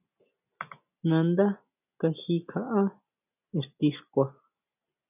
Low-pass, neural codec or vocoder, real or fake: 3.6 kHz; none; real